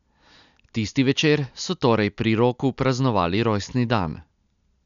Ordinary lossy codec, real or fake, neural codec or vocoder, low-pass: none; real; none; 7.2 kHz